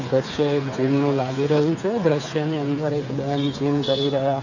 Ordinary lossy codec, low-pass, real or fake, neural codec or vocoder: none; 7.2 kHz; fake; codec, 16 kHz, 8 kbps, FreqCodec, smaller model